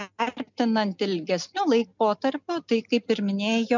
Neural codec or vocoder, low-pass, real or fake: none; 7.2 kHz; real